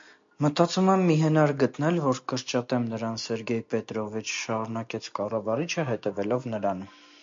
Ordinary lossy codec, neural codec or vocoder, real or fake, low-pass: MP3, 48 kbps; none; real; 7.2 kHz